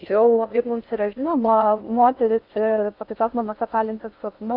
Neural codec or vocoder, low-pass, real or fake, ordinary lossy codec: codec, 16 kHz in and 24 kHz out, 0.6 kbps, FocalCodec, streaming, 4096 codes; 5.4 kHz; fake; Opus, 64 kbps